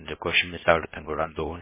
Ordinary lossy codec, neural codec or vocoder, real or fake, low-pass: MP3, 16 kbps; codec, 16 kHz, 0.7 kbps, FocalCodec; fake; 3.6 kHz